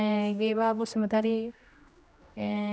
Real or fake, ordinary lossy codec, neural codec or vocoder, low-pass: fake; none; codec, 16 kHz, 1 kbps, X-Codec, HuBERT features, trained on general audio; none